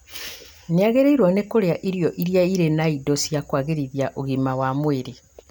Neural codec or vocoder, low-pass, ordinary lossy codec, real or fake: none; none; none; real